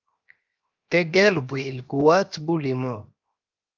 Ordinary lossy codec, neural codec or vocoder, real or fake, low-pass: Opus, 24 kbps; codec, 16 kHz, 0.7 kbps, FocalCodec; fake; 7.2 kHz